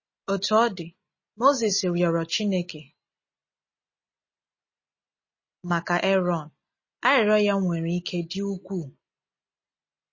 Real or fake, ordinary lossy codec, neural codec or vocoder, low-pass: real; MP3, 32 kbps; none; 7.2 kHz